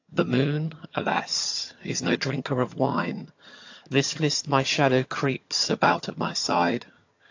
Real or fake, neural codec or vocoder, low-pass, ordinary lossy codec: fake; vocoder, 22.05 kHz, 80 mel bands, HiFi-GAN; 7.2 kHz; AAC, 48 kbps